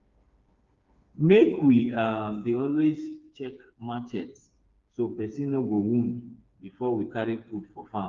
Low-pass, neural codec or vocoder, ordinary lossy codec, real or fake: 7.2 kHz; codec, 16 kHz, 4 kbps, FreqCodec, smaller model; Opus, 32 kbps; fake